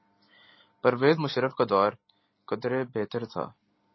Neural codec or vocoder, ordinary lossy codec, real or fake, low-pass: none; MP3, 24 kbps; real; 7.2 kHz